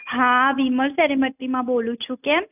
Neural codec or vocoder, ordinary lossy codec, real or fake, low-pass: none; none; real; 3.6 kHz